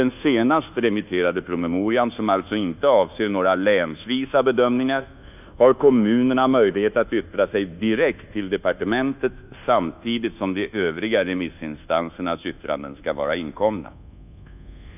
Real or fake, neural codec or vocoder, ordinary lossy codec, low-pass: fake; codec, 24 kHz, 1.2 kbps, DualCodec; none; 3.6 kHz